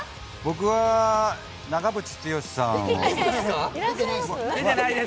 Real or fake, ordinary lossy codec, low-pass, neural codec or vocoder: real; none; none; none